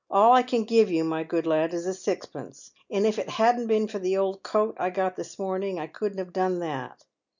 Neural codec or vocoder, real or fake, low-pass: none; real; 7.2 kHz